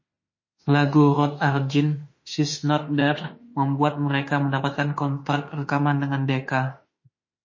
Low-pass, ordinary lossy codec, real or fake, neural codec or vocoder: 7.2 kHz; MP3, 32 kbps; fake; autoencoder, 48 kHz, 32 numbers a frame, DAC-VAE, trained on Japanese speech